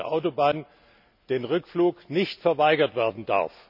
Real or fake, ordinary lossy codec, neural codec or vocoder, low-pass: real; none; none; 5.4 kHz